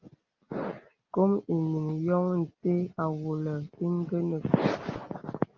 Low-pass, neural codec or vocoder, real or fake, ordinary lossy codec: 7.2 kHz; none; real; Opus, 24 kbps